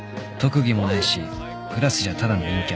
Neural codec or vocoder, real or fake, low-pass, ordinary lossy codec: none; real; none; none